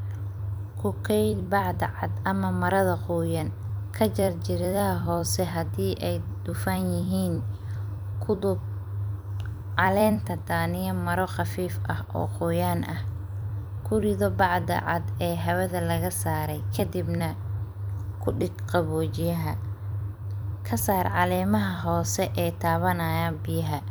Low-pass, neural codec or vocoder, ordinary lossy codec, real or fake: none; vocoder, 44.1 kHz, 128 mel bands every 256 samples, BigVGAN v2; none; fake